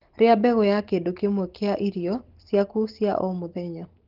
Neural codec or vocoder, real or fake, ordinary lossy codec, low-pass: none; real; Opus, 16 kbps; 5.4 kHz